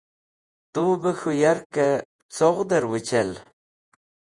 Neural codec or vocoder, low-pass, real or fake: vocoder, 48 kHz, 128 mel bands, Vocos; 10.8 kHz; fake